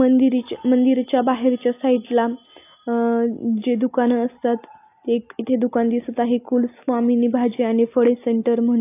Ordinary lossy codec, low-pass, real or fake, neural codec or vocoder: AAC, 24 kbps; 3.6 kHz; real; none